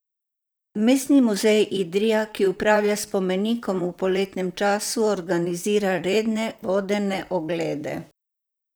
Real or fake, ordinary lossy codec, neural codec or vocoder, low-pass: fake; none; vocoder, 44.1 kHz, 128 mel bands, Pupu-Vocoder; none